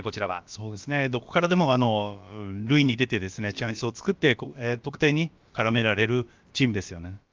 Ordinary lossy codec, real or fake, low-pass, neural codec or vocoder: Opus, 24 kbps; fake; 7.2 kHz; codec, 16 kHz, about 1 kbps, DyCAST, with the encoder's durations